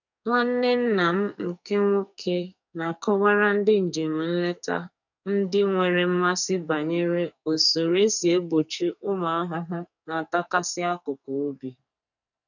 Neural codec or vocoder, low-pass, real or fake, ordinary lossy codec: codec, 44.1 kHz, 2.6 kbps, SNAC; 7.2 kHz; fake; none